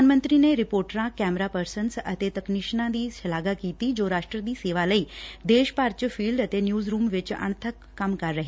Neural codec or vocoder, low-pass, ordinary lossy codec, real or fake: none; none; none; real